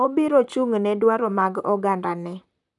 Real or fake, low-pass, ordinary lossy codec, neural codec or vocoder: fake; 10.8 kHz; none; vocoder, 44.1 kHz, 128 mel bands, Pupu-Vocoder